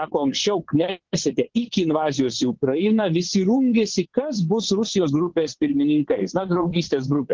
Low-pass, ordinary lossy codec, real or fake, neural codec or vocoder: 7.2 kHz; Opus, 16 kbps; fake; vocoder, 22.05 kHz, 80 mel bands, WaveNeXt